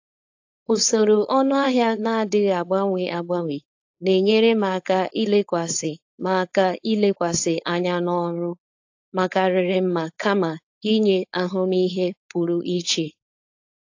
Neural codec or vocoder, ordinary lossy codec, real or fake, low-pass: codec, 16 kHz, 4.8 kbps, FACodec; AAC, 48 kbps; fake; 7.2 kHz